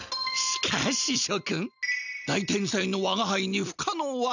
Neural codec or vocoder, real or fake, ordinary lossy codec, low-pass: none; real; none; 7.2 kHz